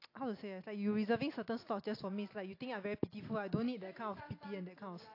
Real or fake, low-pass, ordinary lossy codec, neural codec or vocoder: real; 5.4 kHz; AAC, 32 kbps; none